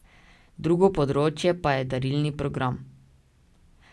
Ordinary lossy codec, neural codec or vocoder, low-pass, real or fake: none; none; none; real